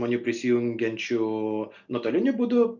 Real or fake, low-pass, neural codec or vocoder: real; 7.2 kHz; none